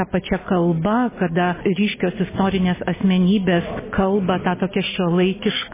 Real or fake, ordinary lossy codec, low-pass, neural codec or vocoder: real; MP3, 16 kbps; 3.6 kHz; none